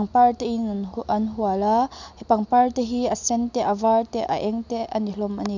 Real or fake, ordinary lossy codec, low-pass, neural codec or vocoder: real; none; 7.2 kHz; none